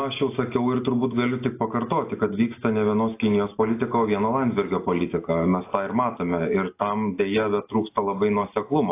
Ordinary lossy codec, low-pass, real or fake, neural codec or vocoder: AAC, 24 kbps; 3.6 kHz; real; none